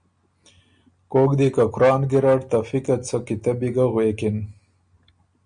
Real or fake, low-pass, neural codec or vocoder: real; 9.9 kHz; none